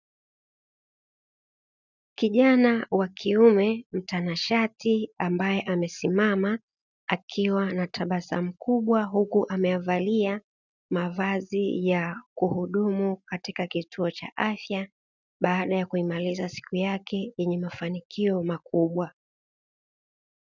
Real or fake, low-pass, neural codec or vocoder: real; 7.2 kHz; none